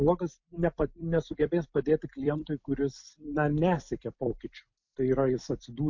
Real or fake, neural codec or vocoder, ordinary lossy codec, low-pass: fake; vocoder, 44.1 kHz, 128 mel bands every 256 samples, BigVGAN v2; MP3, 48 kbps; 7.2 kHz